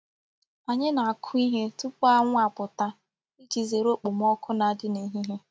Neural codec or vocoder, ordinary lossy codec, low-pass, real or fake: none; none; none; real